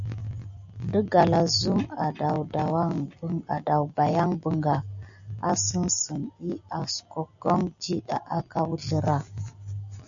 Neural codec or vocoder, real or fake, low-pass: none; real; 7.2 kHz